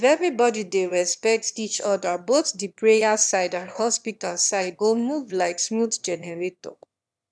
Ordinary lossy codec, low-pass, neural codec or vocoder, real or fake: none; none; autoencoder, 22.05 kHz, a latent of 192 numbers a frame, VITS, trained on one speaker; fake